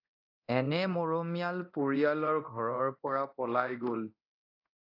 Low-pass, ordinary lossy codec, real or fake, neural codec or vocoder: 5.4 kHz; AAC, 32 kbps; fake; codec, 24 kHz, 0.9 kbps, DualCodec